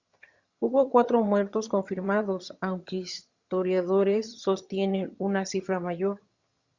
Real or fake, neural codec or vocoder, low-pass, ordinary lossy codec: fake; vocoder, 22.05 kHz, 80 mel bands, HiFi-GAN; 7.2 kHz; Opus, 64 kbps